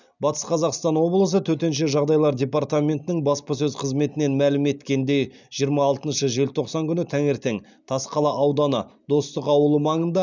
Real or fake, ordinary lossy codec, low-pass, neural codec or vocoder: real; none; 7.2 kHz; none